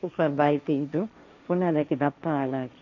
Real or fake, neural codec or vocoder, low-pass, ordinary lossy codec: fake; codec, 16 kHz, 1.1 kbps, Voila-Tokenizer; 7.2 kHz; none